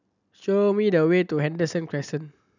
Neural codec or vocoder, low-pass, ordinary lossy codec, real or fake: none; 7.2 kHz; none; real